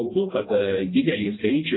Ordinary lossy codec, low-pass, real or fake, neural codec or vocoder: AAC, 16 kbps; 7.2 kHz; fake; codec, 16 kHz, 1 kbps, FreqCodec, smaller model